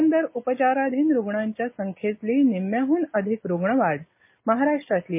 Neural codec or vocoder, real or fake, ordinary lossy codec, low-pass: none; real; MP3, 32 kbps; 3.6 kHz